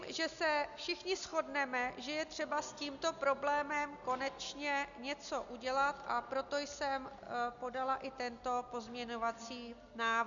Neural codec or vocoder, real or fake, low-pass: none; real; 7.2 kHz